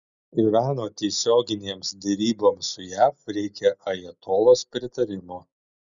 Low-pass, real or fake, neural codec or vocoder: 7.2 kHz; real; none